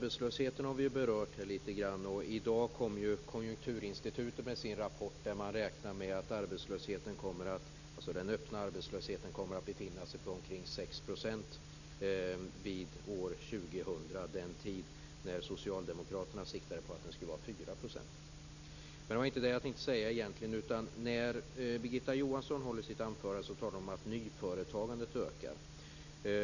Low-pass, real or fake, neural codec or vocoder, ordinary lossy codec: 7.2 kHz; real; none; none